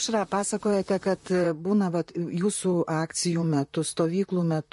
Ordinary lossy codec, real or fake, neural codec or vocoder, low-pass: MP3, 48 kbps; fake; vocoder, 44.1 kHz, 128 mel bands, Pupu-Vocoder; 14.4 kHz